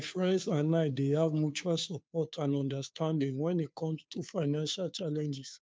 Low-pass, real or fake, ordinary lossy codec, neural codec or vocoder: none; fake; none; codec, 16 kHz, 2 kbps, FunCodec, trained on Chinese and English, 25 frames a second